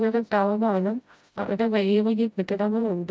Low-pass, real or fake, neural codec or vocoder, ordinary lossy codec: none; fake; codec, 16 kHz, 0.5 kbps, FreqCodec, smaller model; none